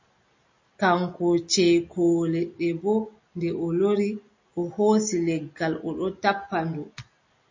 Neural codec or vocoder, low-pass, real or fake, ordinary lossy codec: none; 7.2 kHz; real; MP3, 32 kbps